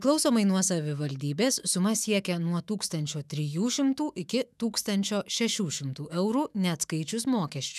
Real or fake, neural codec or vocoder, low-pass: fake; autoencoder, 48 kHz, 128 numbers a frame, DAC-VAE, trained on Japanese speech; 14.4 kHz